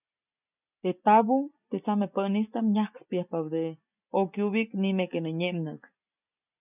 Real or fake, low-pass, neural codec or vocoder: real; 3.6 kHz; none